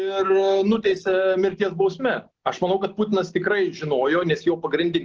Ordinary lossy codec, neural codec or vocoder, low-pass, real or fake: Opus, 16 kbps; none; 7.2 kHz; real